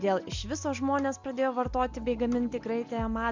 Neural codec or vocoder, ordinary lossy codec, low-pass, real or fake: none; MP3, 64 kbps; 7.2 kHz; real